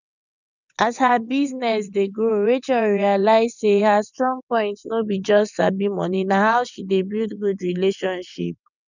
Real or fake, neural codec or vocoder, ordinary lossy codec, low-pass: fake; vocoder, 22.05 kHz, 80 mel bands, WaveNeXt; none; 7.2 kHz